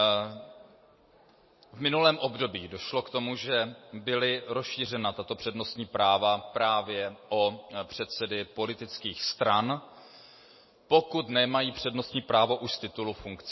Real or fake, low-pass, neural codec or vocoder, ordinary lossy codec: real; 7.2 kHz; none; MP3, 24 kbps